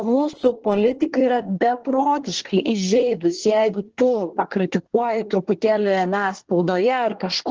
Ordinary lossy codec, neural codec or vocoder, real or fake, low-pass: Opus, 16 kbps; codec, 24 kHz, 1 kbps, SNAC; fake; 7.2 kHz